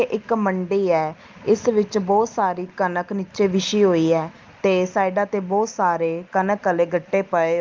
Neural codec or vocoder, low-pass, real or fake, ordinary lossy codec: none; 7.2 kHz; real; Opus, 32 kbps